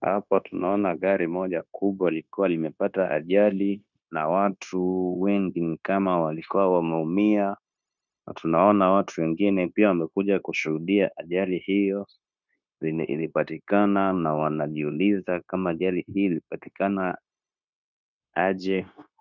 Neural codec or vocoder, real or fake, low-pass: codec, 16 kHz, 0.9 kbps, LongCat-Audio-Codec; fake; 7.2 kHz